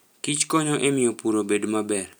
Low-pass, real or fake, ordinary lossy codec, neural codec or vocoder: none; real; none; none